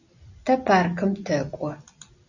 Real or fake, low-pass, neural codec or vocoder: real; 7.2 kHz; none